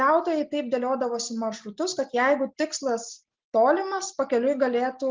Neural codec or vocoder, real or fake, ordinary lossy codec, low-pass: none; real; Opus, 24 kbps; 7.2 kHz